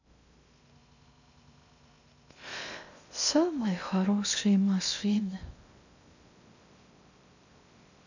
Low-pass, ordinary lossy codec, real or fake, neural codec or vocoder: 7.2 kHz; none; fake; codec, 16 kHz in and 24 kHz out, 0.6 kbps, FocalCodec, streaming, 2048 codes